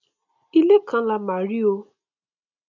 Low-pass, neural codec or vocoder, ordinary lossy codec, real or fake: 7.2 kHz; none; none; real